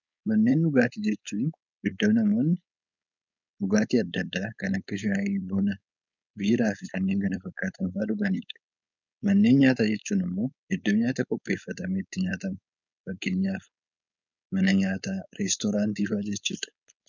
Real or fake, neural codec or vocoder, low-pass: fake; codec, 16 kHz, 4.8 kbps, FACodec; 7.2 kHz